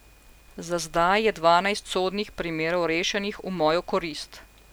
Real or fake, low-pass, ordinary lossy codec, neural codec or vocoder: real; none; none; none